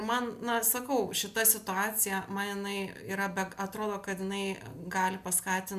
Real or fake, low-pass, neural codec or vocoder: real; 14.4 kHz; none